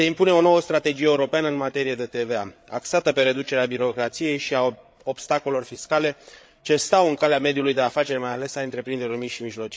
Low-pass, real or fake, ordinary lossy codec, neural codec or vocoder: none; fake; none; codec, 16 kHz, 8 kbps, FreqCodec, larger model